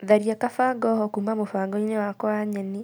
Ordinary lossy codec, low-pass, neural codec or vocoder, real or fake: none; none; none; real